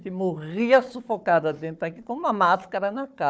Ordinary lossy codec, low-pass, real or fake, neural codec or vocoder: none; none; fake; codec, 16 kHz, 4 kbps, FunCodec, trained on Chinese and English, 50 frames a second